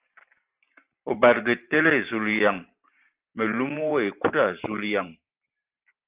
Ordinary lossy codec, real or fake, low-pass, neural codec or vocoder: Opus, 32 kbps; fake; 3.6 kHz; vocoder, 44.1 kHz, 128 mel bands every 512 samples, BigVGAN v2